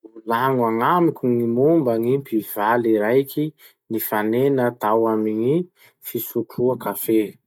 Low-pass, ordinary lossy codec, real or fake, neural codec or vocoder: 19.8 kHz; none; real; none